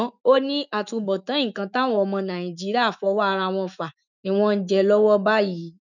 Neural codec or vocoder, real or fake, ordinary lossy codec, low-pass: autoencoder, 48 kHz, 128 numbers a frame, DAC-VAE, trained on Japanese speech; fake; none; 7.2 kHz